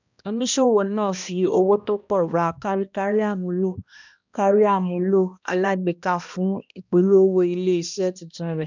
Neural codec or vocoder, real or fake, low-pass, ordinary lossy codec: codec, 16 kHz, 1 kbps, X-Codec, HuBERT features, trained on balanced general audio; fake; 7.2 kHz; none